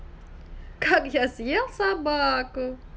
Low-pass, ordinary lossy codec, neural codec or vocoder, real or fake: none; none; none; real